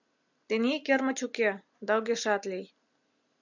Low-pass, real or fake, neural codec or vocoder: 7.2 kHz; real; none